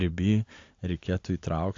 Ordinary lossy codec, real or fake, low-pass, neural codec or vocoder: AAC, 48 kbps; real; 7.2 kHz; none